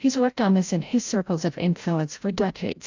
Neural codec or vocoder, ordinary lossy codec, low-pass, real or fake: codec, 16 kHz, 0.5 kbps, FreqCodec, larger model; AAC, 48 kbps; 7.2 kHz; fake